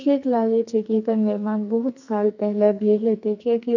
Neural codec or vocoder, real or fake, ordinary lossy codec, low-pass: codec, 44.1 kHz, 2.6 kbps, SNAC; fake; none; 7.2 kHz